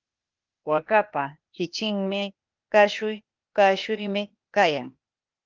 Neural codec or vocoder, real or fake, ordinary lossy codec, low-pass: codec, 16 kHz, 0.8 kbps, ZipCodec; fake; Opus, 32 kbps; 7.2 kHz